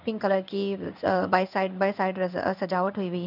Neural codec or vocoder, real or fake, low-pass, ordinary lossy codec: codec, 16 kHz in and 24 kHz out, 1 kbps, XY-Tokenizer; fake; 5.4 kHz; none